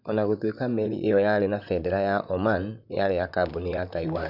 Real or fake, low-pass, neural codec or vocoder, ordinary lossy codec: fake; 5.4 kHz; vocoder, 44.1 kHz, 128 mel bands, Pupu-Vocoder; none